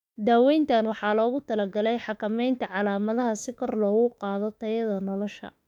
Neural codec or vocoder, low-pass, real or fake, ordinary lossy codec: autoencoder, 48 kHz, 32 numbers a frame, DAC-VAE, trained on Japanese speech; 19.8 kHz; fake; none